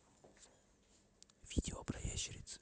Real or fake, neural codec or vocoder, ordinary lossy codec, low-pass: real; none; none; none